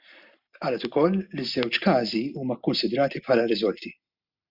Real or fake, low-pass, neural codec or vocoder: real; 5.4 kHz; none